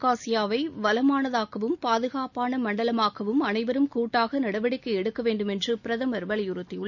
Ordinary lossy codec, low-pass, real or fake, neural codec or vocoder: none; 7.2 kHz; real; none